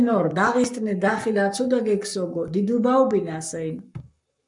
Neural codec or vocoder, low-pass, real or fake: codec, 44.1 kHz, 7.8 kbps, Pupu-Codec; 10.8 kHz; fake